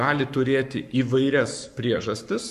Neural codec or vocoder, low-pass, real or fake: codec, 44.1 kHz, 7.8 kbps, Pupu-Codec; 14.4 kHz; fake